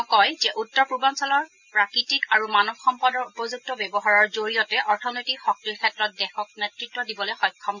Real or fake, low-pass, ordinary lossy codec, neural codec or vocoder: real; 7.2 kHz; none; none